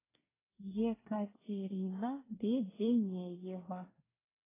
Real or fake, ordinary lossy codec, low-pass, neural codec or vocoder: fake; AAC, 16 kbps; 3.6 kHz; codec, 24 kHz, 1 kbps, SNAC